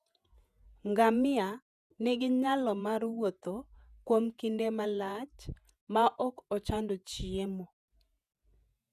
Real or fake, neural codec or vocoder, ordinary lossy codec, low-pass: fake; vocoder, 48 kHz, 128 mel bands, Vocos; none; 14.4 kHz